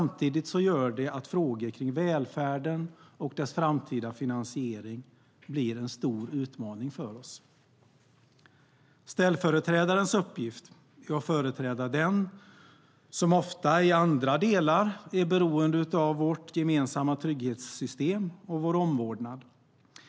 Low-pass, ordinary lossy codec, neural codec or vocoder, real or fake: none; none; none; real